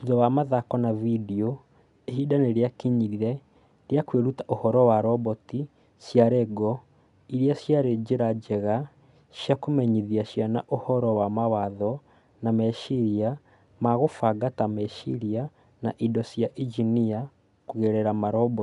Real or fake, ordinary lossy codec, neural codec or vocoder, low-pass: real; none; none; 10.8 kHz